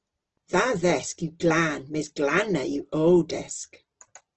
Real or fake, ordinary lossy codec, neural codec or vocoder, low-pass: real; Opus, 16 kbps; none; 7.2 kHz